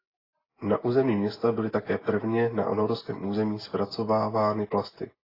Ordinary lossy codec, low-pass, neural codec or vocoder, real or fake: AAC, 24 kbps; 5.4 kHz; none; real